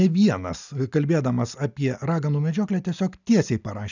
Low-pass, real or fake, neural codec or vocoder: 7.2 kHz; real; none